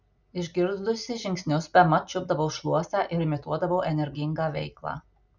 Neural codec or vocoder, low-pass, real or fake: none; 7.2 kHz; real